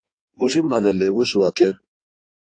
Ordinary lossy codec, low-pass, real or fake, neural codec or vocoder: AAC, 48 kbps; 9.9 kHz; fake; codec, 16 kHz in and 24 kHz out, 1.1 kbps, FireRedTTS-2 codec